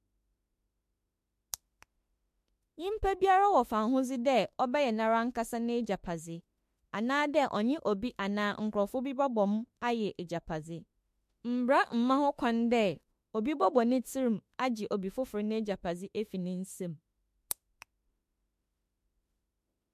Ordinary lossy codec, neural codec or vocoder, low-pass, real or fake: MP3, 64 kbps; autoencoder, 48 kHz, 32 numbers a frame, DAC-VAE, trained on Japanese speech; 14.4 kHz; fake